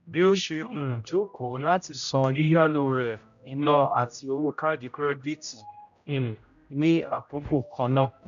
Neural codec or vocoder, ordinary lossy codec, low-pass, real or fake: codec, 16 kHz, 0.5 kbps, X-Codec, HuBERT features, trained on general audio; none; 7.2 kHz; fake